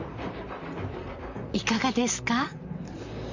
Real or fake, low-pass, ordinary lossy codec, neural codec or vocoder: fake; 7.2 kHz; none; vocoder, 44.1 kHz, 128 mel bands, Pupu-Vocoder